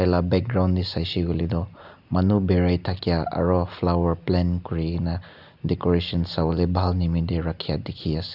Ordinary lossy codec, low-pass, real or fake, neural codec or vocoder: none; 5.4 kHz; real; none